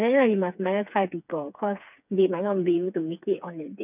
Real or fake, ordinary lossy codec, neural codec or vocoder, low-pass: fake; none; codec, 16 kHz, 4 kbps, FreqCodec, smaller model; 3.6 kHz